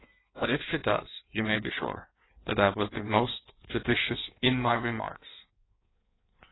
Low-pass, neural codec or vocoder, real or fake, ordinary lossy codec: 7.2 kHz; codec, 16 kHz in and 24 kHz out, 1.1 kbps, FireRedTTS-2 codec; fake; AAC, 16 kbps